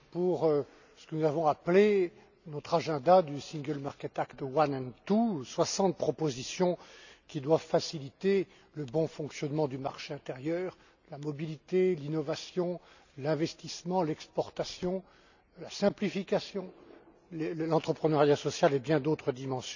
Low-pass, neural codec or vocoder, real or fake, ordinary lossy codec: 7.2 kHz; none; real; none